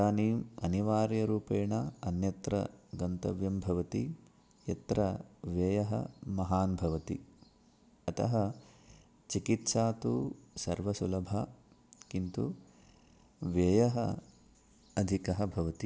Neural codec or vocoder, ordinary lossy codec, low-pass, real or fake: none; none; none; real